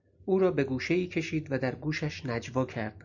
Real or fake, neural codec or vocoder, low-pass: real; none; 7.2 kHz